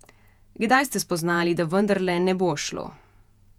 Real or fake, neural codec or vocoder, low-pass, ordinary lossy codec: fake; vocoder, 48 kHz, 128 mel bands, Vocos; 19.8 kHz; none